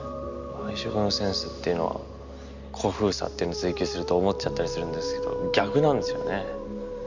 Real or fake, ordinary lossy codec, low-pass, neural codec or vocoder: real; Opus, 64 kbps; 7.2 kHz; none